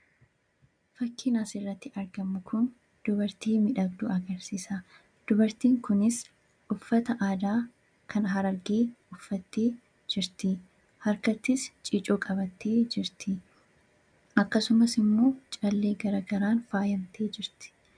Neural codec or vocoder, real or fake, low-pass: none; real; 9.9 kHz